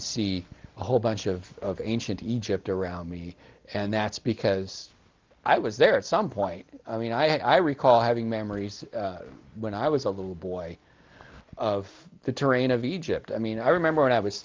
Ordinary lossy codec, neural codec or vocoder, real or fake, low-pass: Opus, 24 kbps; none; real; 7.2 kHz